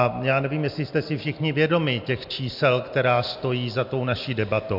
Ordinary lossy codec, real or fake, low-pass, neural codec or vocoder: MP3, 48 kbps; real; 5.4 kHz; none